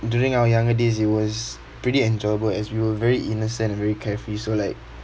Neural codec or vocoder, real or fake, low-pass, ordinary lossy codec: none; real; none; none